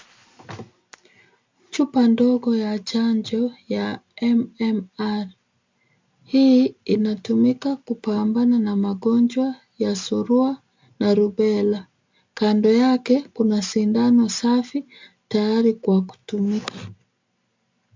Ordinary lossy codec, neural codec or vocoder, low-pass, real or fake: MP3, 64 kbps; none; 7.2 kHz; real